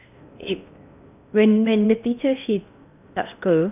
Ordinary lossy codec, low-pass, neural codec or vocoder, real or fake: none; 3.6 kHz; codec, 16 kHz in and 24 kHz out, 0.8 kbps, FocalCodec, streaming, 65536 codes; fake